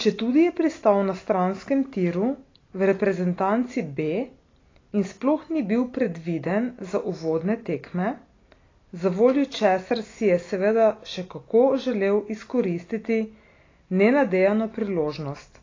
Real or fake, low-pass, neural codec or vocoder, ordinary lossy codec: real; 7.2 kHz; none; AAC, 32 kbps